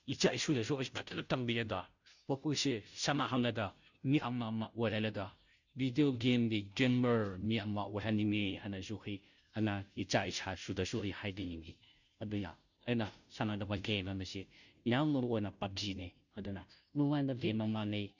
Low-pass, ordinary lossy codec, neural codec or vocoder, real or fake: 7.2 kHz; none; codec, 16 kHz, 0.5 kbps, FunCodec, trained on Chinese and English, 25 frames a second; fake